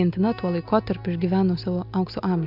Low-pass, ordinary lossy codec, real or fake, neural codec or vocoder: 5.4 kHz; MP3, 48 kbps; real; none